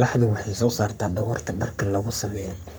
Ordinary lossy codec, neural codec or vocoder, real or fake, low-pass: none; codec, 44.1 kHz, 3.4 kbps, Pupu-Codec; fake; none